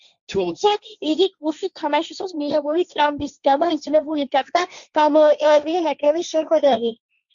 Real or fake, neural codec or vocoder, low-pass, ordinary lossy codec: fake; codec, 16 kHz, 1.1 kbps, Voila-Tokenizer; 7.2 kHz; Opus, 64 kbps